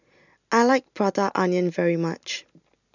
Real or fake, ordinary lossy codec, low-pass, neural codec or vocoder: real; none; 7.2 kHz; none